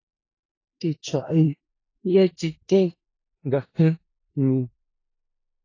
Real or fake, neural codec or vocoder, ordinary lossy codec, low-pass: fake; codec, 16 kHz in and 24 kHz out, 0.4 kbps, LongCat-Audio-Codec, four codebook decoder; AAC, 32 kbps; 7.2 kHz